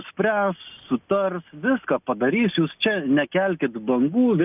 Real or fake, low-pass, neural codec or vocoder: real; 3.6 kHz; none